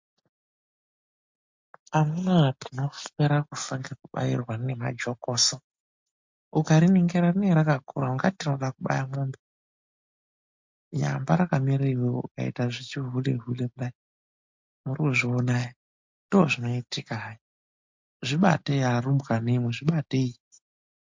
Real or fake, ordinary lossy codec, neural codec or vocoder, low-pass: real; MP3, 48 kbps; none; 7.2 kHz